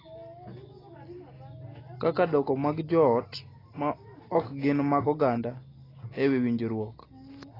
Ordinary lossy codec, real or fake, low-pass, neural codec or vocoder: AAC, 24 kbps; real; 5.4 kHz; none